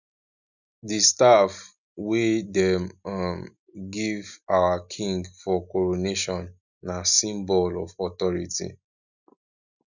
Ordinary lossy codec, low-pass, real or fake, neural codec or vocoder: none; 7.2 kHz; real; none